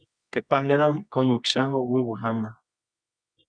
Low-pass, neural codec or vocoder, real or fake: 9.9 kHz; codec, 24 kHz, 0.9 kbps, WavTokenizer, medium music audio release; fake